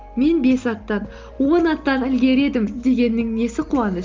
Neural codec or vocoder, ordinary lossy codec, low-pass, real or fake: none; Opus, 24 kbps; 7.2 kHz; real